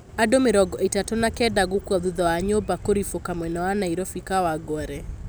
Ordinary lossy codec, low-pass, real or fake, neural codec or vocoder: none; none; real; none